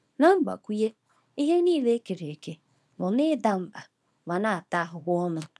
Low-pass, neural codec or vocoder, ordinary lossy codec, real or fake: none; codec, 24 kHz, 0.9 kbps, WavTokenizer, small release; none; fake